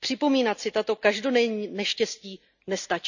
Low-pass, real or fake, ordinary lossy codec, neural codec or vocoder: 7.2 kHz; real; none; none